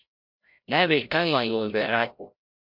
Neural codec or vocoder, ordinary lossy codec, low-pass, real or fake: codec, 16 kHz, 0.5 kbps, FreqCodec, larger model; MP3, 48 kbps; 5.4 kHz; fake